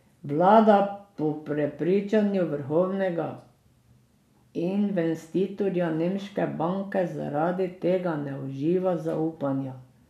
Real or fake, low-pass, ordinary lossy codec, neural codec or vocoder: real; 14.4 kHz; none; none